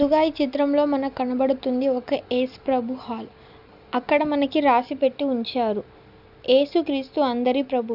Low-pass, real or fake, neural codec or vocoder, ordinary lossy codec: 5.4 kHz; real; none; none